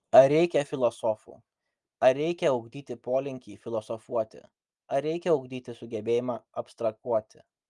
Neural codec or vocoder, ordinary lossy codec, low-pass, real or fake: none; Opus, 32 kbps; 10.8 kHz; real